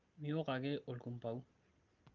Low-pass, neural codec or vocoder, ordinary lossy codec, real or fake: 7.2 kHz; none; Opus, 24 kbps; real